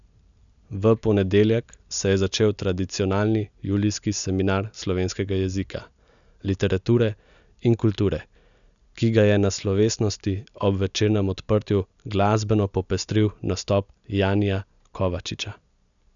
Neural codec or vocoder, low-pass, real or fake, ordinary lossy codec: none; 7.2 kHz; real; none